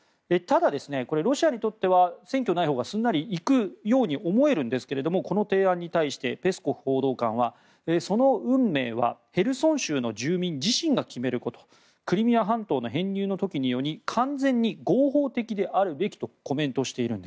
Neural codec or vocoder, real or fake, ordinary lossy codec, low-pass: none; real; none; none